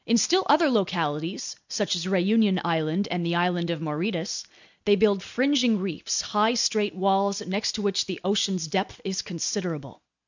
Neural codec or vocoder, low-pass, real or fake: none; 7.2 kHz; real